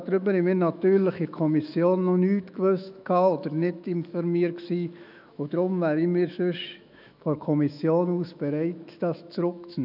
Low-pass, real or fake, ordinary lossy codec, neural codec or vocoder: 5.4 kHz; fake; none; autoencoder, 48 kHz, 128 numbers a frame, DAC-VAE, trained on Japanese speech